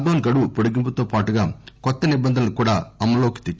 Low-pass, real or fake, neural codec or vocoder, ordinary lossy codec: none; real; none; none